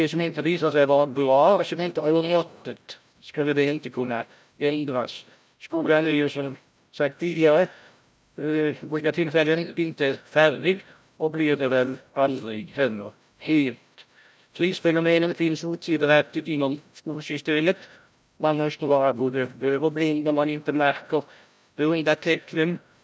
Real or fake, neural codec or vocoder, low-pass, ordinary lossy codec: fake; codec, 16 kHz, 0.5 kbps, FreqCodec, larger model; none; none